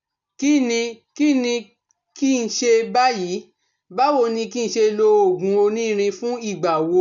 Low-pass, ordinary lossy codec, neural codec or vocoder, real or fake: 7.2 kHz; none; none; real